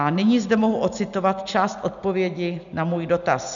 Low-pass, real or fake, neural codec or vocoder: 7.2 kHz; real; none